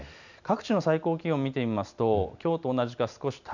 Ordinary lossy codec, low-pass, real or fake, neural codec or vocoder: none; 7.2 kHz; real; none